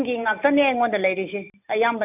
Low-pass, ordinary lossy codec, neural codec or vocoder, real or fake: 3.6 kHz; none; none; real